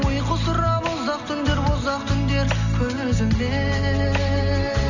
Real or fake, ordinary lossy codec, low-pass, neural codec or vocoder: real; none; 7.2 kHz; none